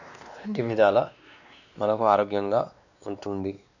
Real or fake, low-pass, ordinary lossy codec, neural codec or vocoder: fake; 7.2 kHz; none; codec, 16 kHz, 2 kbps, X-Codec, WavLM features, trained on Multilingual LibriSpeech